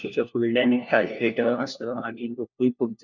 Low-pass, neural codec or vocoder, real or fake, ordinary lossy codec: 7.2 kHz; codec, 16 kHz, 1 kbps, FunCodec, trained on LibriTTS, 50 frames a second; fake; none